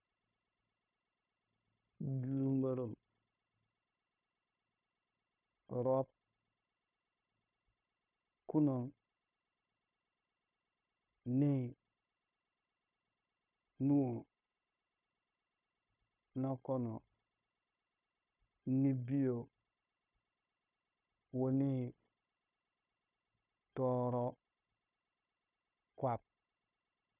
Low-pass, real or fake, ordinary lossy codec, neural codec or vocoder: 3.6 kHz; fake; none; codec, 16 kHz, 0.9 kbps, LongCat-Audio-Codec